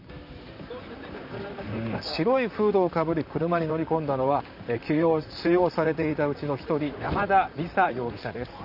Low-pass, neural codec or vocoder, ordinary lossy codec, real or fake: 5.4 kHz; vocoder, 44.1 kHz, 128 mel bands, Pupu-Vocoder; none; fake